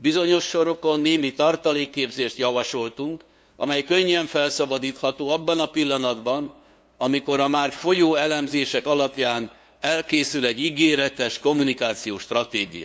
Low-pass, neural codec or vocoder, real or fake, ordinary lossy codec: none; codec, 16 kHz, 2 kbps, FunCodec, trained on LibriTTS, 25 frames a second; fake; none